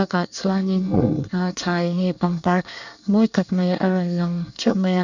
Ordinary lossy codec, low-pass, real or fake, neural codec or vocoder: none; 7.2 kHz; fake; codec, 24 kHz, 1 kbps, SNAC